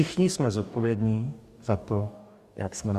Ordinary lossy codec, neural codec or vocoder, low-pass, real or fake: Opus, 64 kbps; codec, 44.1 kHz, 2.6 kbps, DAC; 14.4 kHz; fake